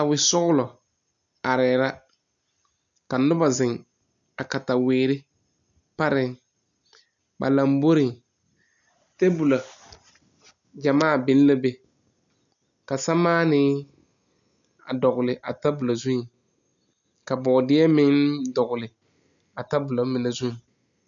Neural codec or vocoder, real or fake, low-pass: none; real; 7.2 kHz